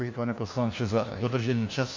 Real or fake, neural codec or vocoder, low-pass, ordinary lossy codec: fake; codec, 16 kHz, 1 kbps, FunCodec, trained on LibriTTS, 50 frames a second; 7.2 kHz; AAC, 48 kbps